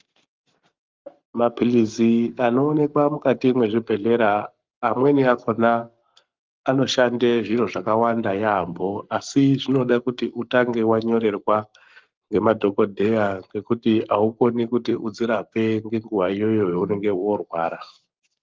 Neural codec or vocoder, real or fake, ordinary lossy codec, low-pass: codec, 44.1 kHz, 7.8 kbps, Pupu-Codec; fake; Opus, 32 kbps; 7.2 kHz